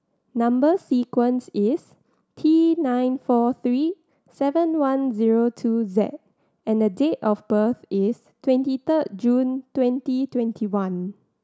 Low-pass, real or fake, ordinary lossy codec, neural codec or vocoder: none; real; none; none